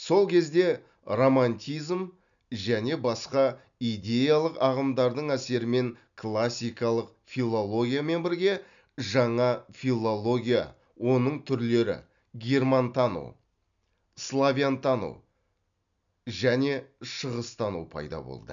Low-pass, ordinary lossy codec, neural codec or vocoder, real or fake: 7.2 kHz; none; none; real